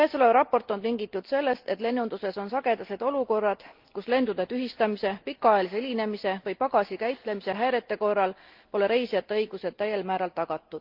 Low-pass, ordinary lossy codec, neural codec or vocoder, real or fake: 5.4 kHz; Opus, 32 kbps; none; real